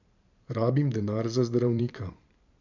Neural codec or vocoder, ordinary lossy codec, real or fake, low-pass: vocoder, 44.1 kHz, 128 mel bands every 512 samples, BigVGAN v2; none; fake; 7.2 kHz